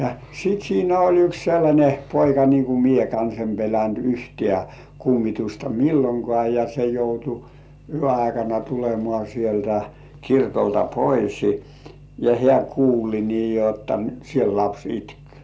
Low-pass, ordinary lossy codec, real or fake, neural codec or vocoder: none; none; real; none